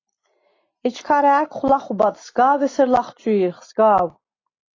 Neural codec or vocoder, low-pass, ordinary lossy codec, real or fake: none; 7.2 kHz; AAC, 32 kbps; real